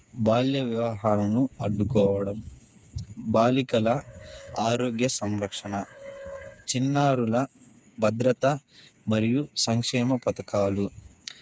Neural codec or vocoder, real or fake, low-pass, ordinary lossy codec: codec, 16 kHz, 4 kbps, FreqCodec, smaller model; fake; none; none